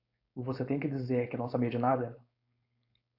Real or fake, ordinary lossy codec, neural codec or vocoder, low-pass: fake; AAC, 48 kbps; codec, 16 kHz, 4.8 kbps, FACodec; 5.4 kHz